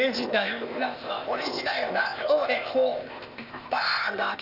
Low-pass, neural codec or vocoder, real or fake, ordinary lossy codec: 5.4 kHz; codec, 16 kHz, 0.8 kbps, ZipCodec; fake; none